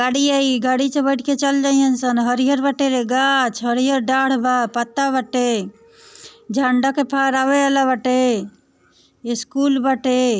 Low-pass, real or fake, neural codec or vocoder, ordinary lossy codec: none; real; none; none